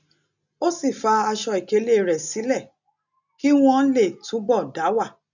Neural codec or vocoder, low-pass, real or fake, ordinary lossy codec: none; 7.2 kHz; real; none